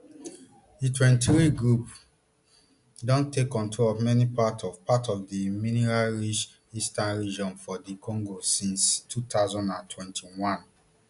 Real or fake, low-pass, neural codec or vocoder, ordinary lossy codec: real; 10.8 kHz; none; none